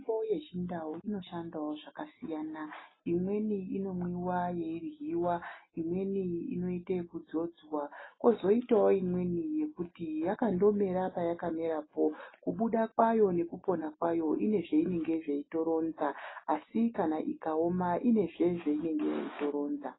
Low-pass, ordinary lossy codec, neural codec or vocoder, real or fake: 7.2 kHz; AAC, 16 kbps; none; real